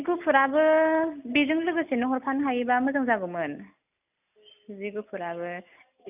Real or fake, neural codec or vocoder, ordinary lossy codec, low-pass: real; none; none; 3.6 kHz